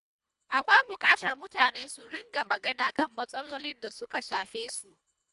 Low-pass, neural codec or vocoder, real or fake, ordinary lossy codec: 10.8 kHz; codec, 24 kHz, 1.5 kbps, HILCodec; fake; MP3, 96 kbps